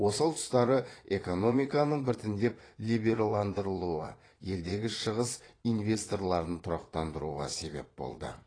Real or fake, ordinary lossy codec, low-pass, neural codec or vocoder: fake; AAC, 32 kbps; 9.9 kHz; vocoder, 22.05 kHz, 80 mel bands, Vocos